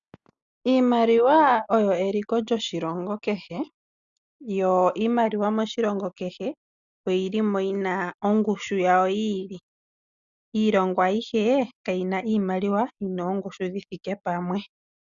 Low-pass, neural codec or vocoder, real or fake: 7.2 kHz; none; real